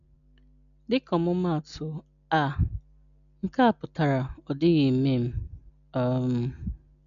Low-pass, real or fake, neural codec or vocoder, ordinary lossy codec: 7.2 kHz; real; none; none